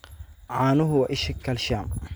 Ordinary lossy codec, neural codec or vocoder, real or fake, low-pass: none; none; real; none